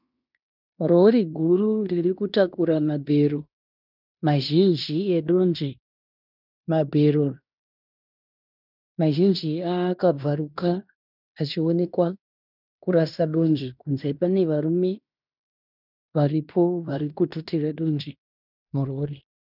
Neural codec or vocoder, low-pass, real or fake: codec, 16 kHz in and 24 kHz out, 0.9 kbps, LongCat-Audio-Codec, fine tuned four codebook decoder; 5.4 kHz; fake